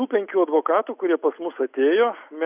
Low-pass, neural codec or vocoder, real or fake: 3.6 kHz; none; real